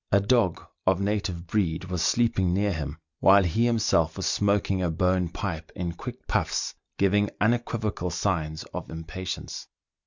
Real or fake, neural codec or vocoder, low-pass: real; none; 7.2 kHz